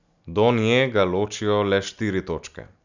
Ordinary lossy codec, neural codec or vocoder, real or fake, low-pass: none; none; real; 7.2 kHz